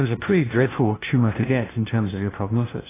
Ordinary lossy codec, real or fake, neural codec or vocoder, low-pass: AAC, 16 kbps; fake; codec, 16 kHz, 1 kbps, FunCodec, trained on LibriTTS, 50 frames a second; 3.6 kHz